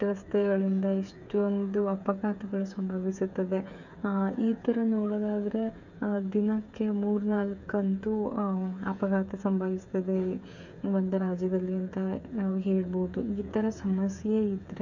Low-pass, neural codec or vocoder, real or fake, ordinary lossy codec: 7.2 kHz; codec, 16 kHz, 8 kbps, FreqCodec, smaller model; fake; none